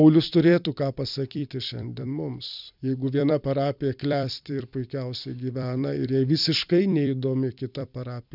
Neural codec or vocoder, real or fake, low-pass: vocoder, 44.1 kHz, 128 mel bands every 256 samples, BigVGAN v2; fake; 5.4 kHz